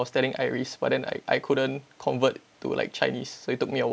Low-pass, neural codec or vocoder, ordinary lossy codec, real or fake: none; none; none; real